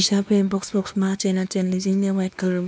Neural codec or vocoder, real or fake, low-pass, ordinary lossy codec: codec, 16 kHz, 2 kbps, X-Codec, WavLM features, trained on Multilingual LibriSpeech; fake; none; none